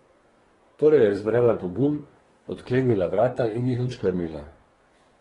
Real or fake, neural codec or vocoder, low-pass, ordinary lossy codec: fake; codec, 24 kHz, 1 kbps, SNAC; 10.8 kHz; AAC, 32 kbps